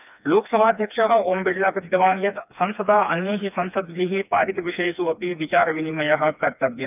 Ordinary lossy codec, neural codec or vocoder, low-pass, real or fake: none; codec, 16 kHz, 2 kbps, FreqCodec, smaller model; 3.6 kHz; fake